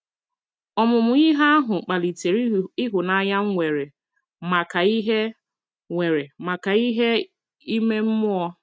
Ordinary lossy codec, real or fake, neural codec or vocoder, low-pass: none; real; none; none